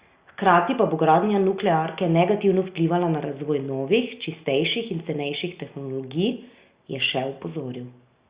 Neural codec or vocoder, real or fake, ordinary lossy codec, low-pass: none; real; Opus, 64 kbps; 3.6 kHz